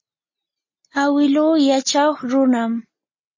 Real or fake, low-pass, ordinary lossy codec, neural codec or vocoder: real; 7.2 kHz; MP3, 32 kbps; none